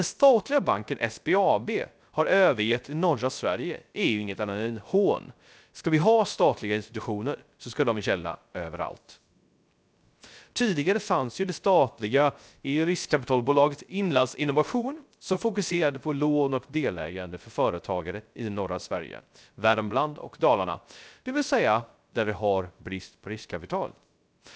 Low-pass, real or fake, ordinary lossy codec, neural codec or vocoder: none; fake; none; codec, 16 kHz, 0.3 kbps, FocalCodec